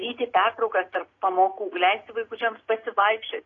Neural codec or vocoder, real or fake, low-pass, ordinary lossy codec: none; real; 7.2 kHz; AAC, 32 kbps